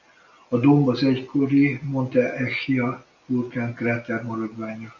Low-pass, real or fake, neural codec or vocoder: 7.2 kHz; real; none